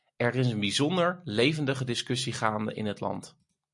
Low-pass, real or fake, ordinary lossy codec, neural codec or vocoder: 10.8 kHz; real; MP3, 64 kbps; none